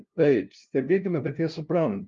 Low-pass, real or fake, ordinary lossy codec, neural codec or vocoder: 7.2 kHz; fake; Opus, 32 kbps; codec, 16 kHz, 0.5 kbps, FunCodec, trained on LibriTTS, 25 frames a second